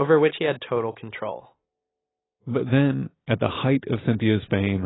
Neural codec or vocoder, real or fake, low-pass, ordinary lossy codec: none; real; 7.2 kHz; AAC, 16 kbps